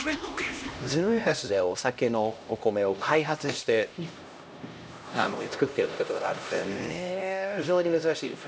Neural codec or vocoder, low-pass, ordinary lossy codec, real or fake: codec, 16 kHz, 1 kbps, X-Codec, HuBERT features, trained on LibriSpeech; none; none; fake